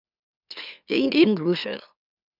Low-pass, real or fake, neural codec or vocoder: 5.4 kHz; fake; autoencoder, 44.1 kHz, a latent of 192 numbers a frame, MeloTTS